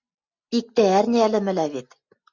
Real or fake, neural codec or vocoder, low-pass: real; none; 7.2 kHz